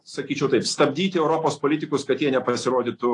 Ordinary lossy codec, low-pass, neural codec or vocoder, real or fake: AAC, 48 kbps; 10.8 kHz; none; real